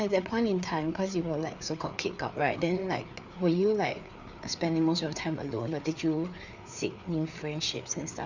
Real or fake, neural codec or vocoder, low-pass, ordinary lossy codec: fake; codec, 16 kHz, 4 kbps, FreqCodec, larger model; 7.2 kHz; none